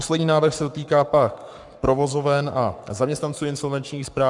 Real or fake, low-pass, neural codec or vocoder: fake; 10.8 kHz; codec, 44.1 kHz, 7.8 kbps, Pupu-Codec